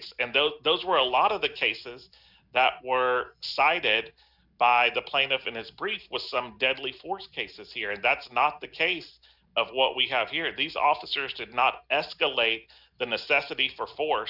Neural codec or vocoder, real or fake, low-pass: none; real; 5.4 kHz